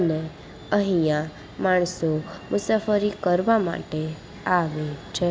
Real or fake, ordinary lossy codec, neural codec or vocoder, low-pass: real; none; none; none